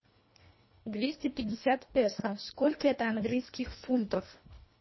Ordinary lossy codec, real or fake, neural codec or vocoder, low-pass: MP3, 24 kbps; fake; codec, 24 kHz, 1.5 kbps, HILCodec; 7.2 kHz